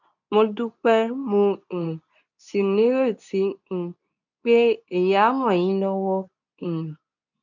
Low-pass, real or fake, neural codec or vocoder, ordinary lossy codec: 7.2 kHz; fake; codec, 24 kHz, 0.9 kbps, WavTokenizer, medium speech release version 2; none